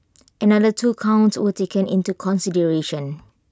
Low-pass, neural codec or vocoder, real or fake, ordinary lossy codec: none; none; real; none